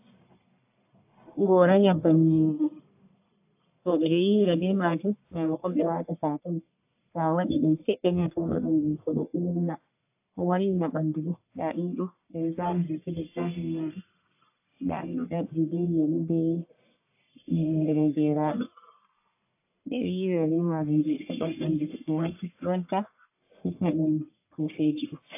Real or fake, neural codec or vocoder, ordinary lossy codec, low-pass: fake; codec, 44.1 kHz, 1.7 kbps, Pupu-Codec; AAC, 32 kbps; 3.6 kHz